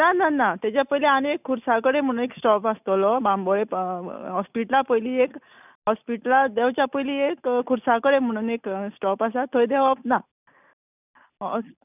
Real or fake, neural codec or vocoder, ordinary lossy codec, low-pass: real; none; none; 3.6 kHz